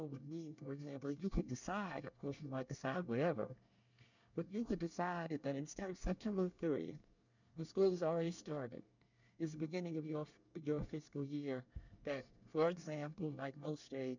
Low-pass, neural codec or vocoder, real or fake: 7.2 kHz; codec, 24 kHz, 1 kbps, SNAC; fake